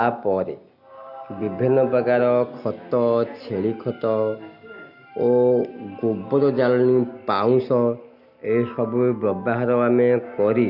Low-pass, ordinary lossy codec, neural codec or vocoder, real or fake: 5.4 kHz; Opus, 64 kbps; none; real